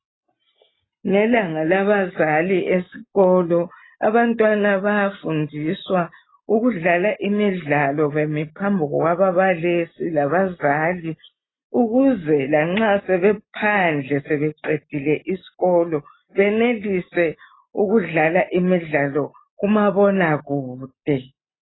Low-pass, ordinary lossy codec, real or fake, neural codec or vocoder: 7.2 kHz; AAC, 16 kbps; real; none